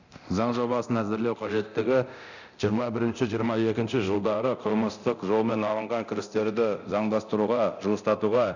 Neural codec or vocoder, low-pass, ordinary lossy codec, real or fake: codec, 24 kHz, 0.9 kbps, DualCodec; 7.2 kHz; none; fake